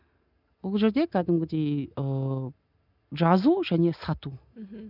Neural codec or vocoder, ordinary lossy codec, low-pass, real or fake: none; none; 5.4 kHz; real